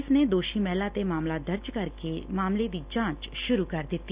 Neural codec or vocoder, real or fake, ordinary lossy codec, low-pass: none; real; Opus, 64 kbps; 3.6 kHz